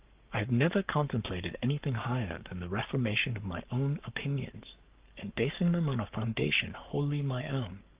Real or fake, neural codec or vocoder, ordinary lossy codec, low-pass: fake; codec, 44.1 kHz, 7.8 kbps, Pupu-Codec; Opus, 32 kbps; 3.6 kHz